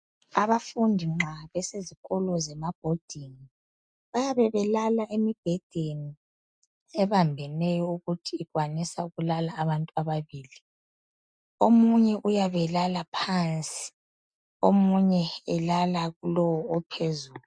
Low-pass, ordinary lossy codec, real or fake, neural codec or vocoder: 9.9 kHz; MP3, 96 kbps; real; none